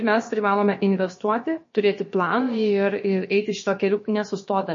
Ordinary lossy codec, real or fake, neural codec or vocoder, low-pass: MP3, 32 kbps; fake; codec, 16 kHz, about 1 kbps, DyCAST, with the encoder's durations; 7.2 kHz